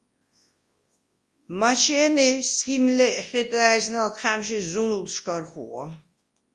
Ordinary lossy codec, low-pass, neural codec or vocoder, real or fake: Opus, 32 kbps; 10.8 kHz; codec, 24 kHz, 0.9 kbps, WavTokenizer, large speech release; fake